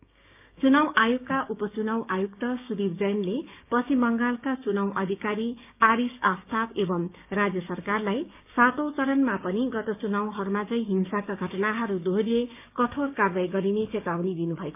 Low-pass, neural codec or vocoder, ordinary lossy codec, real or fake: 3.6 kHz; codec, 16 kHz, 6 kbps, DAC; none; fake